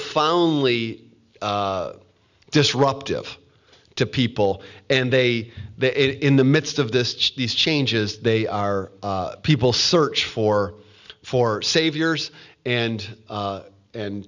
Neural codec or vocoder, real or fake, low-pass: none; real; 7.2 kHz